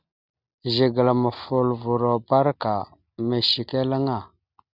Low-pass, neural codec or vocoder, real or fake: 5.4 kHz; none; real